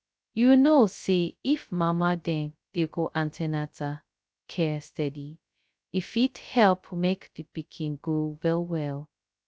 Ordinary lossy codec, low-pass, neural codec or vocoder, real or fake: none; none; codec, 16 kHz, 0.2 kbps, FocalCodec; fake